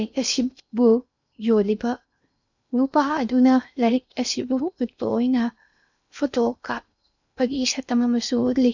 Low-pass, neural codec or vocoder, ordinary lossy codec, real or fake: 7.2 kHz; codec, 16 kHz in and 24 kHz out, 0.8 kbps, FocalCodec, streaming, 65536 codes; none; fake